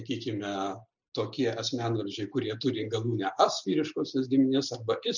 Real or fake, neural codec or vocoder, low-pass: real; none; 7.2 kHz